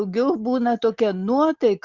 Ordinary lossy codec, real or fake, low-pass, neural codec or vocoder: Opus, 64 kbps; real; 7.2 kHz; none